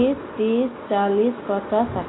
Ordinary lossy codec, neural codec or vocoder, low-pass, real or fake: AAC, 16 kbps; none; 7.2 kHz; real